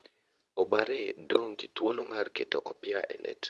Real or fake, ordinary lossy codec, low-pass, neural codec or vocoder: fake; none; none; codec, 24 kHz, 0.9 kbps, WavTokenizer, medium speech release version 2